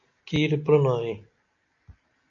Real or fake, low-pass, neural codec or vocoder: real; 7.2 kHz; none